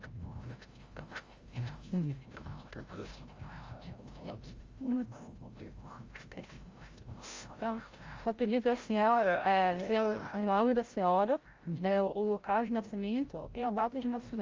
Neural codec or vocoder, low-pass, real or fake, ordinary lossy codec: codec, 16 kHz, 0.5 kbps, FreqCodec, larger model; 7.2 kHz; fake; Opus, 32 kbps